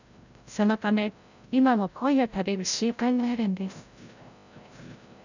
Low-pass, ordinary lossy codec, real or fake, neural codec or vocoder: 7.2 kHz; none; fake; codec, 16 kHz, 0.5 kbps, FreqCodec, larger model